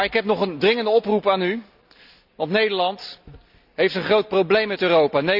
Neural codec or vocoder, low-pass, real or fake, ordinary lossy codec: none; 5.4 kHz; real; none